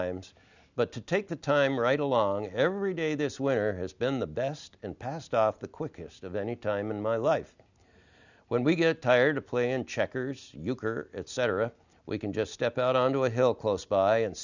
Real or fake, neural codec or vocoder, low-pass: real; none; 7.2 kHz